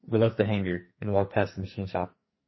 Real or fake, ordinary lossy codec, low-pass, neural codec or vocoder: fake; MP3, 24 kbps; 7.2 kHz; codec, 44.1 kHz, 2.6 kbps, SNAC